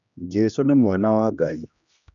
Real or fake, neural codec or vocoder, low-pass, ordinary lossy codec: fake; codec, 16 kHz, 1 kbps, X-Codec, HuBERT features, trained on general audio; 7.2 kHz; none